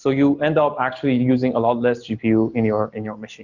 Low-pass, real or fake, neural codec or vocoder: 7.2 kHz; real; none